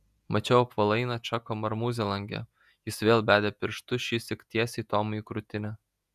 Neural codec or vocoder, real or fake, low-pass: vocoder, 48 kHz, 128 mel bands, Vocos; fake; 14.4 kHz